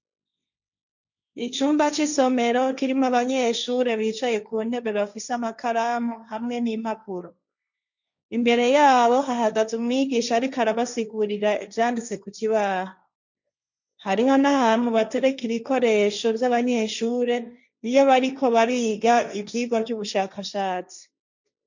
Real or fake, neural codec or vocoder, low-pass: fake; codec, 16 kHz, 1.1 kbps, Voila-Tokenizer; 7.2 kHz